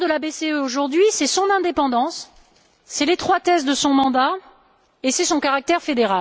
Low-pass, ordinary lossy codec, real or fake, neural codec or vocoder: none; none; real; none